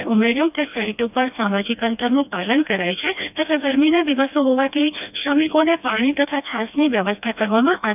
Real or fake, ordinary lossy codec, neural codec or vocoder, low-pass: fake; none; codec, 16 kHz, 1 kbps, FreqCodec, smaller model; 3.6 kHz